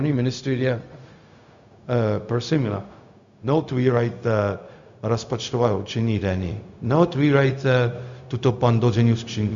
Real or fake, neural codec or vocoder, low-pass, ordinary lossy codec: fake; codec, 16 kHz, 0.4 kbps, LongCat-Audio-Codec; 7.2 kHz; Opus, 64 kbps